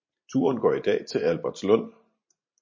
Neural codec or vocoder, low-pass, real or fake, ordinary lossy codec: vocoder, 44.1 kHz, 128 mel bands every 512 samples, BigVGAN v2; 7.2 kHz; fake; MP3, 32 kbps